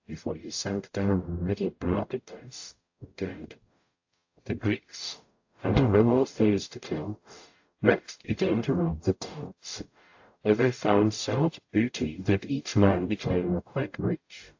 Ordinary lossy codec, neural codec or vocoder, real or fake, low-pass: AAC, 48 kbps; codec, 44.1 kHz, 0.9 kbps, DAC; fake; 7.2 kHz